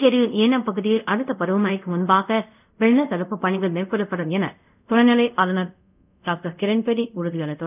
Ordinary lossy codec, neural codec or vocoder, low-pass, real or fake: none; codec, 24 kHz, 0.5 kbps, DualCodec; 3.6 kHz; fake